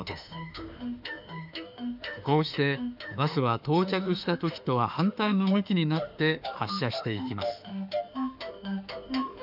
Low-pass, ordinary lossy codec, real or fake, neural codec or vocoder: 5.4 kHz; none; fake; autoencoder, 48 kHz, 32 numbers a frame, DAC-VAE, trained on Japanese speech